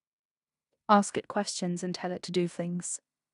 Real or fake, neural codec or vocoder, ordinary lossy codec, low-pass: fake; codec, 16 kHz in and 24 kHz out, 0.9 kbps, LongCat-Audio-Codec, fine tuned four codebook decoder; none; 10.8 kHz